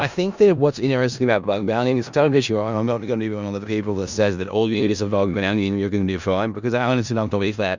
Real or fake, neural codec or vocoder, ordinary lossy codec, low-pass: fake; codec, 16 kHz in and 24 kHz out, 0.4 kbps, LongCat-Audio-Codec, four codebook decoder; Opus, 64 kbps; 7.2 kHz